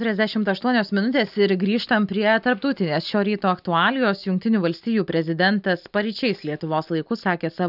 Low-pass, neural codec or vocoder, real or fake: 5.4 kHz; none; real